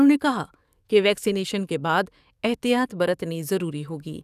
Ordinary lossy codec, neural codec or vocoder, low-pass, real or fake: none; codec, 44.1 kHz, 7.8 kbps, DAC; 14.4 kHz; fake